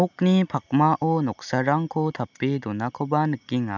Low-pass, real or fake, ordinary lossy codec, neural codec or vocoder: 7.2 kHz; real; none; none